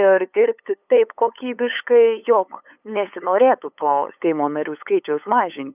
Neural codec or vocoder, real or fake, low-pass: codec, 16 kHz, 8 kbps, FunCodec, trained on LibriTTS, 25 frames a second; fake; 3.6 kHz